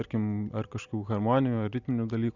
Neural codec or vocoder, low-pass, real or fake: none; 7.2 kHz; real